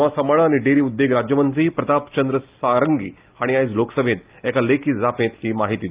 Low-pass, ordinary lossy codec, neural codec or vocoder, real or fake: 3.6 kHz; Opus, 32 kbps; none; real